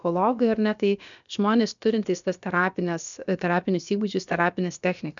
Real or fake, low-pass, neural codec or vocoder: fake; 7.2 kHz; codec, 16 kHz, about 1 kbps, DyCAST, with the encoder's durations